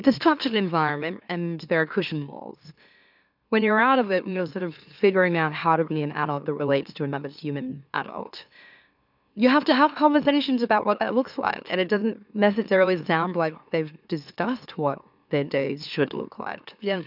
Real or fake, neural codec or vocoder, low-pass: fake; autoencoder, 44.1 kHz, a latent of 192 numbers a frame, MeloTTS; 5.4 kHz